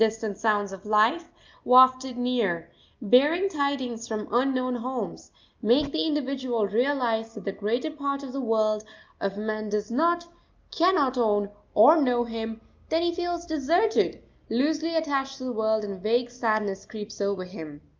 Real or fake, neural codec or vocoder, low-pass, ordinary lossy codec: real; none; 7.2 kHz; Opus, 24 kbps